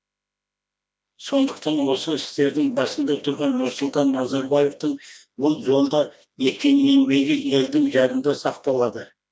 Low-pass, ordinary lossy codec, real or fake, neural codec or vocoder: none; none; fake; codec, 16 kHz, 1 kbps, FreqCodec, smaller model